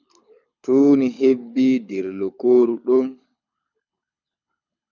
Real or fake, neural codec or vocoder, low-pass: fake; codec, 24 kHz, 6 kbps, HILCodec; 7.2 kHz